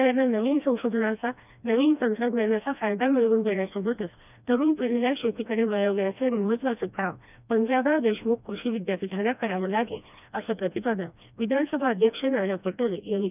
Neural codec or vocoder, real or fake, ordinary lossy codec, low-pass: codec, 16 kHz, 1 kbps, FreqCodec, smaller model; fake; none; 3.6 kHz